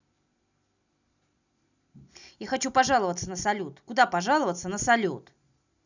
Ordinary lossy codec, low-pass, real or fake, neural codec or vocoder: none; 7.2 kHz; real; none